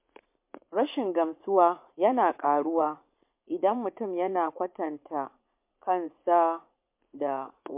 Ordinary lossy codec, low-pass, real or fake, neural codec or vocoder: MP3, 32 kbps; 3.6 kHz; fake; vocoder, 44.1 kHz, 80 mel bands, Vocos